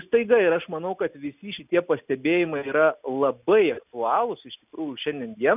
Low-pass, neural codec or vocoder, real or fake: 3.6 kHz; none; real